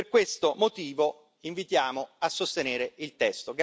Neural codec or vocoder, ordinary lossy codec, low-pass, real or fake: none; none; none; real